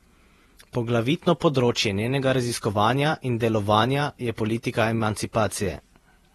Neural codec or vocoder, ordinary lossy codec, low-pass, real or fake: vocoder, 48 kHz, 128 mel bands, Vocos; AAC, 32 kbps; 19.8 kHz; fake